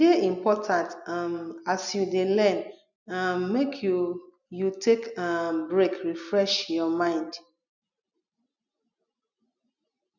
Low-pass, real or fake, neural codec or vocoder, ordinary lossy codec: 7.2 kHz; real; none; none